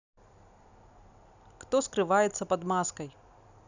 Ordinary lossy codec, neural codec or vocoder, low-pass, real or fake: none; none; 7.2 kHz; real